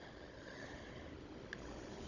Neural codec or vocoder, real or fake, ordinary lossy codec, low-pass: codec, 16 kHz, 16 kbps, FunCodec, trained on Chinese and English, 50 frames a second; fake; none; 7.2 kHz